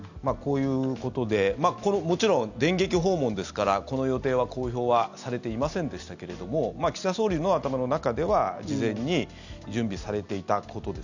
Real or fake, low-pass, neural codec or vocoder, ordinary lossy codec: real; 7.2 kHz; none; none